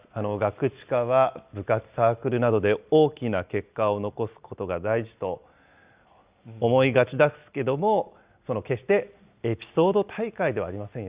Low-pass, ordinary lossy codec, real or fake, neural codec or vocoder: 3.6 kHz; Opus, 64 kbps; real; none